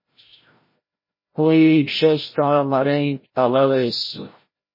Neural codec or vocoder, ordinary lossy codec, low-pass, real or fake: codec, 16 kHz, 0.5 kbps, FreqCodec, larger model; MP3, 24 kbps; 5.4 kHz; fake